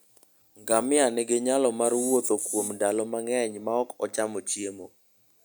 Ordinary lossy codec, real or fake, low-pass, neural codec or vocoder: none; real; none; none